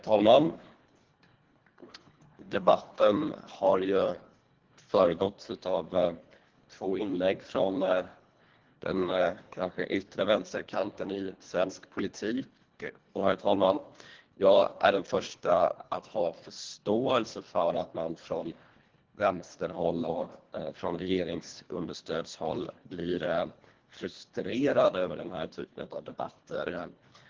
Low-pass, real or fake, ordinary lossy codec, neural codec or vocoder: 7.2 kHz; fake; Opus, 16 kbps; codec, 24 kHz, 1.5 kbps, HILCodec